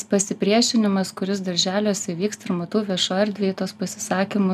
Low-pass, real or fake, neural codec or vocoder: 14.4 kHz; real; none